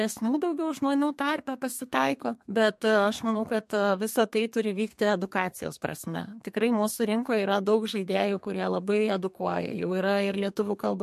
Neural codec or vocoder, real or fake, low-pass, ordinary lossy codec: codec, 32 kHz, 1.9 kbps, SNAC; fake; 14.4 kHz; MP3, 64 kbps